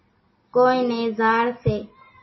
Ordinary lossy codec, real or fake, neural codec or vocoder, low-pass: MP3, 24 kbps; real; none; 7.2 kHz